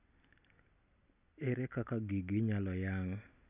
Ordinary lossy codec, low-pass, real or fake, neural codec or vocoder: none; 3.6 kHz; real; none